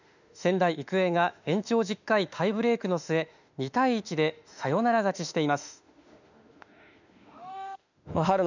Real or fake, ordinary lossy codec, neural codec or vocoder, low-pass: fake; none; autoencoder, 48 kHz, 32 numbers a frame, DAC-VAE, trained on Japanese speech; 7.2 kHz